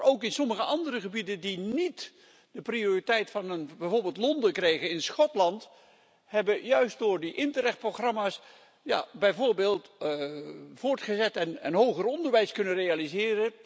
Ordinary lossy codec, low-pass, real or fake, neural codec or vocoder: none; none; real; none